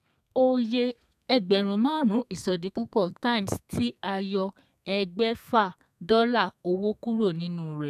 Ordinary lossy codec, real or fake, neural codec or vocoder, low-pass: none; fake; codec, 32 kHz, 1.9 kbps, SNAC; 14.4 kHz